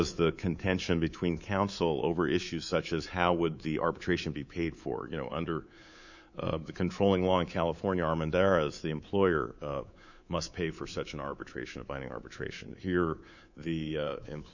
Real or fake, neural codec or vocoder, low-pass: fake; codec, 24 kHz, 3.1 kbps, DualCodec; 7.2 kHz